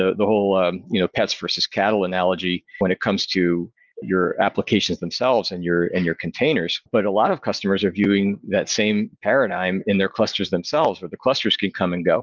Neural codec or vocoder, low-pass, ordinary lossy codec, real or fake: none; 7.2 kHz; Opus, 32 kbps; real